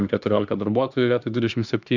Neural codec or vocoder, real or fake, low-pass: autoencoder, 48 kHz, 32 numbers a frame, DAC-VAE, trained on Japanese speech; fake; 7.2 kHz